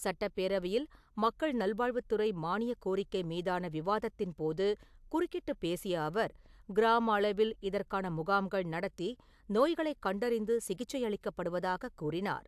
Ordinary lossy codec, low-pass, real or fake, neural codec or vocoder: AAC, 96 kbps; 14.4 kHz; real; none